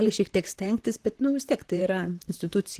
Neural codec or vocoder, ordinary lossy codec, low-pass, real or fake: vocoder, 44.1 kHz, 128 mel bands, Pupu-Vocoder; Opus, 16 kbps; 14.4 kHz; fake